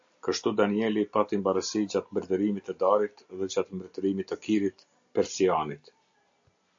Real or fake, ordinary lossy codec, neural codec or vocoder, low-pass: real; AAC, 64 kbps; none; 7.2 kHz